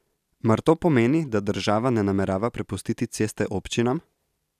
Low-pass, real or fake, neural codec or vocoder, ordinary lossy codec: 14.4 kHz; real; none; none